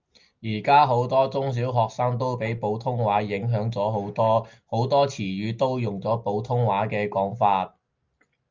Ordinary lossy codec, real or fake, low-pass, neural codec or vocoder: Opus, 24 kbps; real; 7.2 kHz; none